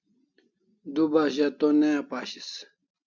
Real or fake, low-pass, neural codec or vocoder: real; 7.2 kHz; none